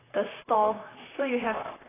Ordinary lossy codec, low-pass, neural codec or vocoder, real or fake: AAC, 16 kbps; 3.6 kHz; vocoder, 44.1 kHz, 128 mel bands, Pupu-Vocoder; fake